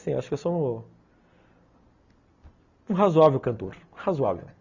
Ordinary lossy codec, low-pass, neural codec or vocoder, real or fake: Opus, 64 kbps; 7.2 kHz; none; real